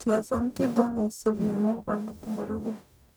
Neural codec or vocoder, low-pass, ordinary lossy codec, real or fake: codec, 44.1 kHz, 0.9 kbps, DAC; none; none; fake